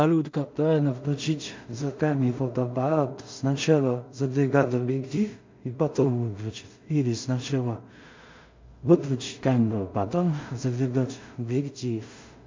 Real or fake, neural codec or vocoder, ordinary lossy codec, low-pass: fake; codec, 16 kHz in and 24 kHz out, 0.4 kbps, LongCat-Audio-Codec, two codebook decoder; MP3, 64 kbps; 7.2 kHz